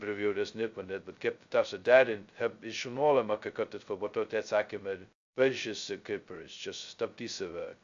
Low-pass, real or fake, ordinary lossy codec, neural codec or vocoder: 7.2 kHz; fake; AAC, 64 kbps; codec, 16 kHz, 0.2 kbps, FocalCodec